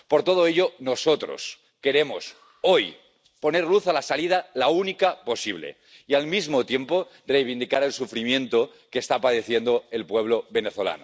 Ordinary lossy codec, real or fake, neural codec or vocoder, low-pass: none; real; none; none